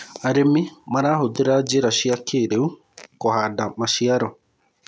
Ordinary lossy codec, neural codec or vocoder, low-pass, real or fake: none; none; none; real